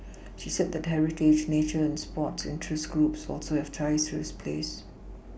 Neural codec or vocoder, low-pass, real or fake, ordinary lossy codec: none; none; real; none